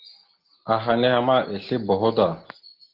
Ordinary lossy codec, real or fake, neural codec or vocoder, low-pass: Opus, 16 kbps; real; none; 5.4 kHz